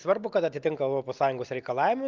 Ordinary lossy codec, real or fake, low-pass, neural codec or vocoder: Opus, 32 kbps; real; 7.2 kHz; none